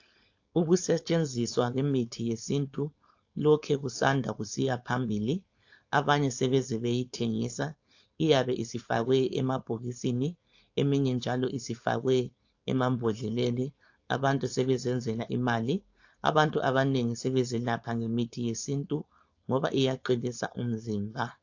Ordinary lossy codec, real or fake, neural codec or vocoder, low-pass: AAC, 48 kbps; fake; codec, 16 kHz, 4.8 kbps, FACodec; 7.2 kHz